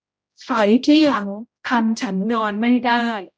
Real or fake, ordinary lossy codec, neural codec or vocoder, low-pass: fake; none; codec, 16 kHz, 0.5 kbps, X-Codec, HuBERT features, trained on general audio; none